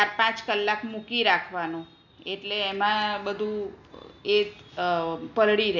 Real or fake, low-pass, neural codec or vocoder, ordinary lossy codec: real; 7.2 kHz; none; none